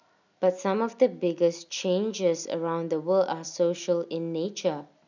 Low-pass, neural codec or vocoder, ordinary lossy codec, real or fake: 7.2 kHz; none; none; real